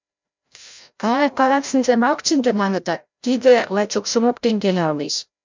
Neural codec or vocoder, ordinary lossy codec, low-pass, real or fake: codec, 16 kHz, 0.5 kbps, FreqCodec, larger model; MP3, 64 kbps; 7.2 kHz; fake